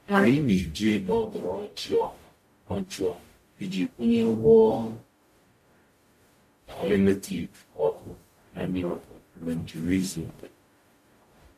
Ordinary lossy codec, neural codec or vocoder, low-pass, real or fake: MP3, 64 kbps; codec, 44.1 kHz, 0.9 kbps, DAC; 14.4 kHz; fake